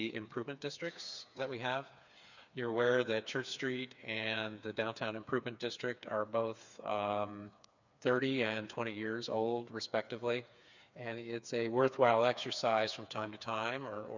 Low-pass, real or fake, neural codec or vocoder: 7.2 kHz; fake; codec, 16 kHz, 4 kbps, FreqCodec, smaller model